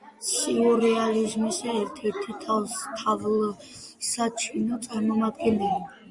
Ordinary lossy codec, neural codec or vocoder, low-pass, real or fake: Opus, 64 kbps; none; 10.8 kHz; real